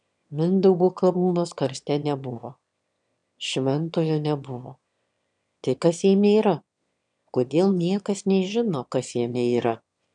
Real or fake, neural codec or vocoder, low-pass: fake; autoencoder, 22.05 kHz, a latent of 192 numbers a frame, VITS, trained on one speaker; 9.9 kHz